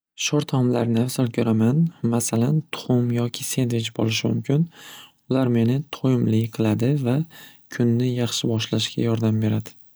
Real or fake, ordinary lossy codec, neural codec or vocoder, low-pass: real; none; none; none